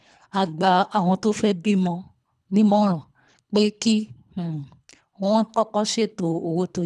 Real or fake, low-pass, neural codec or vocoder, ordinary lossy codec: fake; none; codec, 24 kHz, 3 kbps, HILCodec; none